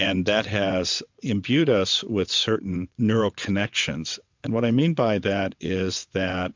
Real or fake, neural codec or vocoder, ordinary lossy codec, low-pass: fake; vocoder, 22.05 kHz, 80 mel bands, WaveNeXt; MP3, 64 kbps; 7.2 kHz